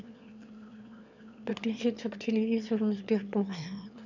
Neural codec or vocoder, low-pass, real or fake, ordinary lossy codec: autoencoder, 22.05 kHz, a latent of 192 numbers a frame, VITS, trained on one speaker; 7.2 kHz; fake; none